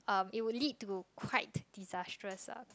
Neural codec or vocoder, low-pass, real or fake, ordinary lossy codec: none; none; real; none